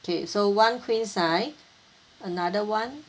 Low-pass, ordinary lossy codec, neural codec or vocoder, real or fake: none; none; none; real